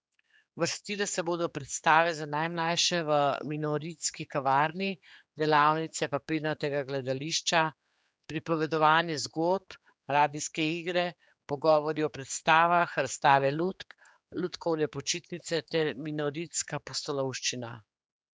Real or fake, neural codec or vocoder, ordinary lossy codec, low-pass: fake; codec, 16 kHz, 4 kbps, X-Codec, HuBERT features, trained on general audio; none; none